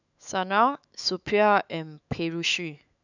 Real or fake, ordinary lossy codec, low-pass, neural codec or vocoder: fake; none; 7.2 kHz; autoencoder, 48 kHz, 128 numbers a frame, DAC-VAE, trained on Japanese speech